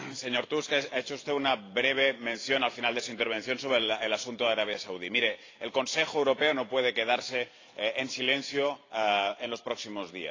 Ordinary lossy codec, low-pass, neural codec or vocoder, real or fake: AAC, 32 kbps; 7.2 kHz; vocoder, 44.1 kHz, 128 mel bands every 512 samples, BigVGAN v2; fake